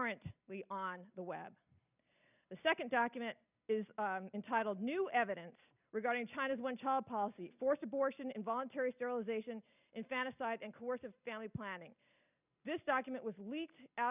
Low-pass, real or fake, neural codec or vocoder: 3.6 kHz; real; none